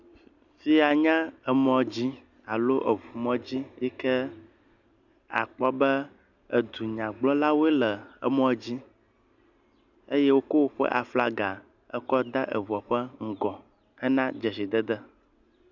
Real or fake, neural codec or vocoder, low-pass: real; none; 7.2 kHz